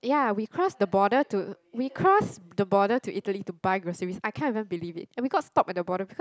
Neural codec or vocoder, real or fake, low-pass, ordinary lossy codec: none; real; none; none